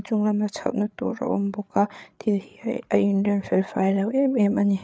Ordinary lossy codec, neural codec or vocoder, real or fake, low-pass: none; codec, 16 kHz, 8 kbps, FreqCodec, larger model; fake; none